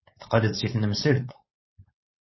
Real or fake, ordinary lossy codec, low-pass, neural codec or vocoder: fake; MP3, 24 kbps; 7.2 kHz; codec, 16 kHz, 4.8 kbps, FACodec